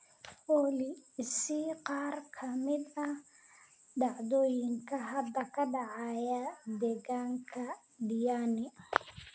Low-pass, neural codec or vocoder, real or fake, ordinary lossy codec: none; none; real; none